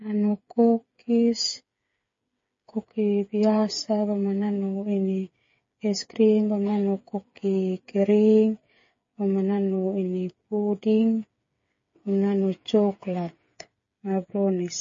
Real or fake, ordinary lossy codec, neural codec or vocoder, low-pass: fake; MP3, 32 kbps; codec, 16 kHz, 8 kbps, FreqCodec, smaller model; 7.2 kHz